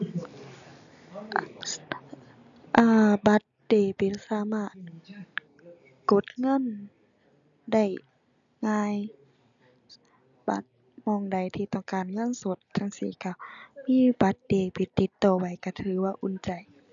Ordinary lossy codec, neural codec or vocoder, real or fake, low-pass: none; none; real; 7.2 kHz